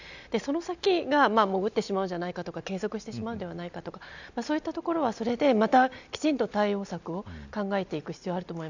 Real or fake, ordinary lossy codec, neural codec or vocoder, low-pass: real; none; none; 7.2 kHz